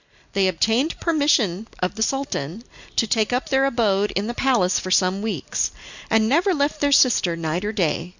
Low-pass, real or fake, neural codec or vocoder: 7.2 kHz; real; none